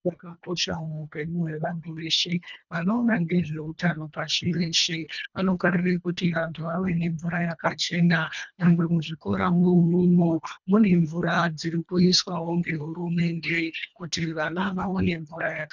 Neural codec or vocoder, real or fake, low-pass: codec, 24 kHz, 1.5 kbps, HILCodec; fake; 7.2 kHz